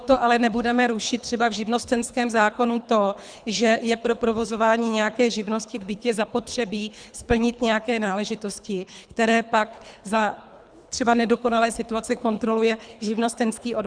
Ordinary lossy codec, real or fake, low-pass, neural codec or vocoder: Opus, 64 kbps; fake; 9.9 kHz; codec, 24 kHz, 3 kbps, HILCodec